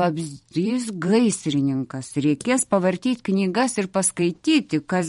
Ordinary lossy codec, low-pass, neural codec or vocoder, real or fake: MP3, 48 kbps; 19.8 kHz; vocoder, 44.1 kHz, 128 mel bands every 512 samples, BigVGAN v2; fake